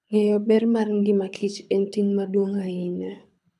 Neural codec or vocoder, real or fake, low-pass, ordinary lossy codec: codec, 24 kHz, 6 kbps, HILCodec; fake; none; none